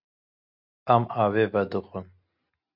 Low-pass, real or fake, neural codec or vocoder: 5.4 kHz; real; none